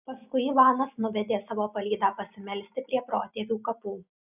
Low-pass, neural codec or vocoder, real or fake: 3.6 kHz; none; real